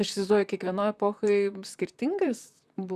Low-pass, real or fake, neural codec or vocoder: 14.4 kHz; fake; vocoder, 44.1 kHz, 128 mel bands, Pupu-Vocoder